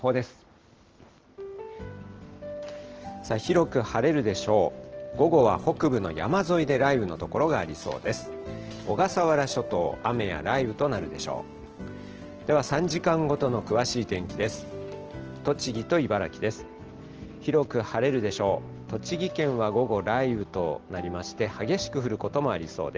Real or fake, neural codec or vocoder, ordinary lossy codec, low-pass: real; none; Opus, 16 kbps; 7.2 kHz